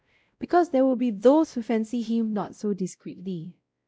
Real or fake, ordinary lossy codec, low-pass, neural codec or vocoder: fake; none; none; codec, 16 kHz, 0.5 kbps, X-Codec, WavLM features, trained on Multilingual LibriSpeech